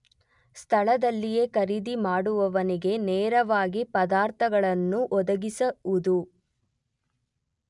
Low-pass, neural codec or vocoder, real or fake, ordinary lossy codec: 10.8 kHz; none; real; none